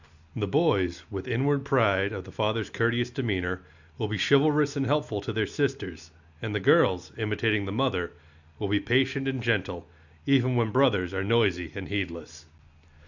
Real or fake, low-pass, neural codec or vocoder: real; 7.2 kHz; none